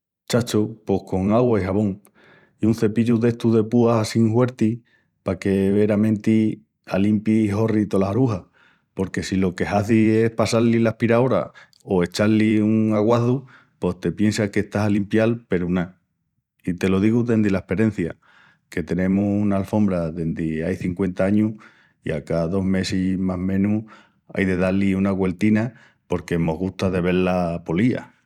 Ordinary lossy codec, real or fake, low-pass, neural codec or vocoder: none; fake; 19.8 kHz; vocoder, 44.1 kHz, 128 mel bands every 512 samples, BigVGAN v2